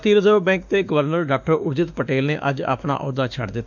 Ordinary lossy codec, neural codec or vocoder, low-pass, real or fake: none; autoencoder, 48 kHz, 32 numbers a frame, DAC-VAE, trained on Japanese speech; 7.2 kHz; fake